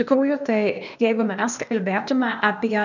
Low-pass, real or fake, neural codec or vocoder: 7.2 kHz; fake; codec, 16 kHz, 0.8 kbps, ZipCodec